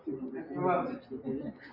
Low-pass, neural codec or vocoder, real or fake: 5.4 kHz; none; real